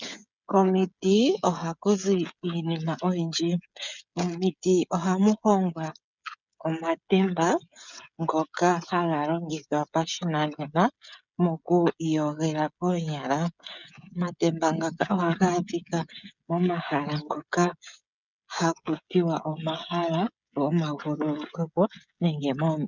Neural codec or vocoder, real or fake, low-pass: codec, 16 kHz, 16 kbps, FreqCodec, smaller model; fake; 7.2 kHz